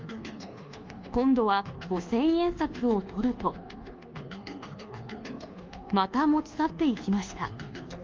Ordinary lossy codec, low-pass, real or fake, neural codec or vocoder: Opus, 32 kbps; 7.2 kHz; fake; codec, 24 kHz, 1.2 kbps, DualCodec